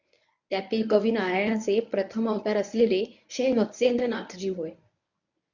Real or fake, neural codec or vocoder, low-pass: fake; codec, 24 kHz, 0.9 kbps, WavTokenizer, medium speech release version 1; 7.2 kHz